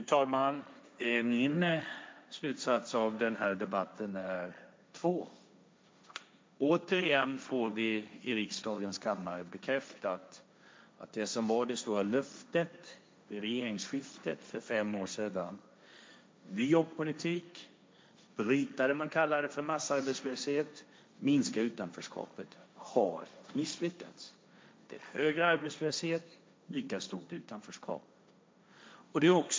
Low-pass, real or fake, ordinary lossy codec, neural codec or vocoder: none; fake; none; codec, 16 kHz, 1.1 kbps, Voila-Tokenizer